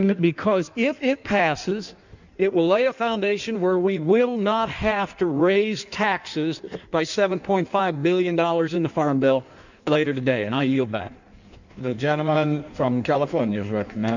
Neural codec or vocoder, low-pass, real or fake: codec, 16 kHz in and 24 kHz out, 1.1 kbps, FireRedTTS-2 codec; 7.2 kHz; fake